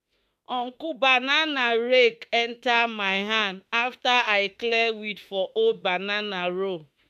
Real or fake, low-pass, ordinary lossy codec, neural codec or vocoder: fake; 14.4 kHz; AAC, 96 kbps; autoencoder, 48 kHz, 32 numbers a frame, DAC-VAE, trained on Japanese speech